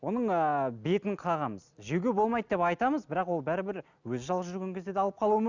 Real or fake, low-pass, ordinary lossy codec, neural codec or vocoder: real; 7.2 kHz; none; none